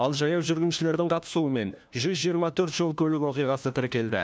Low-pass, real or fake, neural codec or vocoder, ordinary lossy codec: none; fake; codec, 16 kHz, 1 kbps, FunCodec, trained on LibriTTS, 50 frames a second; none